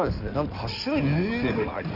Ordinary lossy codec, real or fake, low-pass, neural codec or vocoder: none; fake; 5.4 kHz; codec, 16 kHz in and 24 kHz out, 2.2 kbps, FireRedTTS-2 codec